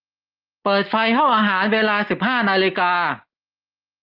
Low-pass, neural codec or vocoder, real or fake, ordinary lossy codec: 5.4 kHz; none; real; Opus, 24 kbps